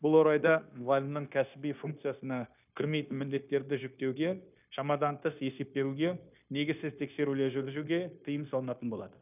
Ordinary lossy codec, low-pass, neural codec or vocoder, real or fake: none; 3.6 kHz; codec, 16 kHz, 0.9 kbps, LongCat-Audio-Codec; fake